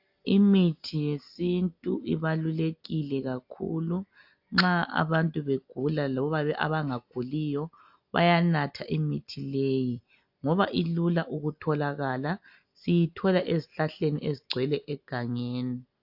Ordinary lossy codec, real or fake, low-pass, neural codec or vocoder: AAC, 48 kbps; real; 5.4 kHz; none